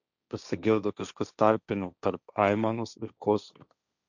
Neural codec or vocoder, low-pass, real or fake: codec, 16 kHz, 1.1 kbps, Voila-Tokenizer; 7.2 kHz; fake